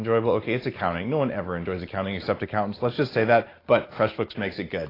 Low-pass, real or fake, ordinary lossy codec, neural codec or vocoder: 5.4 kHz; real; AAC, 24 kbps; none